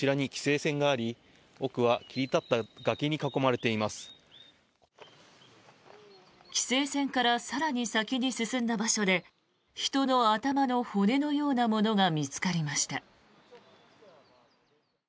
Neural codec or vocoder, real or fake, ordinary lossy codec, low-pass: none; real; none; none